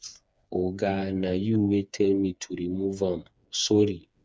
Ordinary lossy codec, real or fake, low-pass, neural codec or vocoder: none; fake; none; codec, 16 kHz, 4 kbps, FreqCodec, smaller model